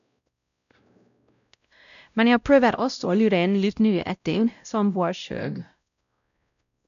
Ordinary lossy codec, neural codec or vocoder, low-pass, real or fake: none; codec, 16 kHz, 0.5 kbps, X-Codec, WavLM features, trained on Multilingual LibriSpeech; 7.2 kHz; fake